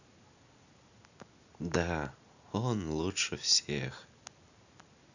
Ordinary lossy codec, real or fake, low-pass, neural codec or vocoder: none; real; 7.2 kHz; none